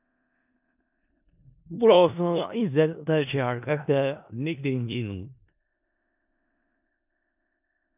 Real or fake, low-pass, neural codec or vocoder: fake; 3.6 kHz; codec, 16 kHz in and 24 kHz out, 0.4 kbps, LongCat-Audio-Codec, four codebook decoder